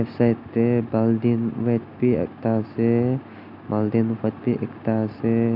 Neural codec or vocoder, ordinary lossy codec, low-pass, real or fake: none; none; 5.4 kHz; real